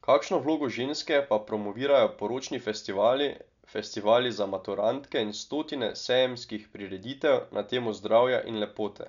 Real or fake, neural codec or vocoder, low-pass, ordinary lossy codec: real; none; 7.2 kHz; none